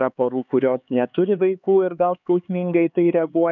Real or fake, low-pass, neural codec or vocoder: fake; 7.2 kHz; codec, 16 kHz, 4 kbps, X-Codec, HuBERT features, trained on LibriSpeech